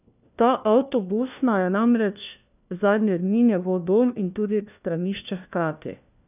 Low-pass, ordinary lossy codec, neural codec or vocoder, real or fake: 3.6 kHz; none; codec, 16 kHz, 1 kbps, FunCodec, trained on LibriTTS, 50 frames a second; fake